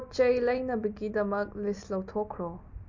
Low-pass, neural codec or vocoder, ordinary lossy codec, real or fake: 7.2 kHz; none; MP3, 48 kbps; real